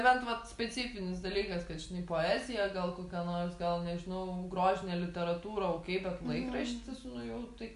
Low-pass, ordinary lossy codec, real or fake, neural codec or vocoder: 10.8 kHz; MP3, 96 kbps; real; none